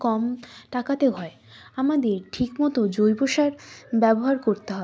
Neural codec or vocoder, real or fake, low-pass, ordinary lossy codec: none; real; none; none